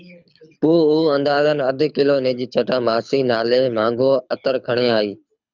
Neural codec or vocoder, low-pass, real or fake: codec, 24 kHz, 6 kbps, HILCodec; 7.2 kHz; fake